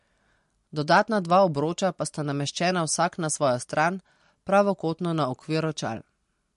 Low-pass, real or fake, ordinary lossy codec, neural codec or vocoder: 10.8 kHz; real; MP3, 48 kbps; none